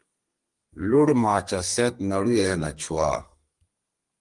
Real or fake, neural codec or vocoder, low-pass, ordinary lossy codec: fake; codec, 32 kHz, 1.9 kbps, SNAC; 10.8 kHz; Opus, 24 kbps